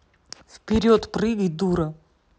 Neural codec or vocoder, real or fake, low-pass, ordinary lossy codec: none; real; none; none